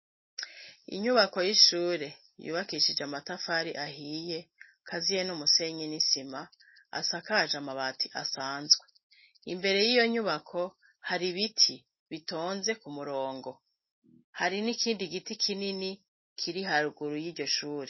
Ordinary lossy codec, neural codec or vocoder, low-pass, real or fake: MP3, 24 kbps; none; 7.2 kHz; real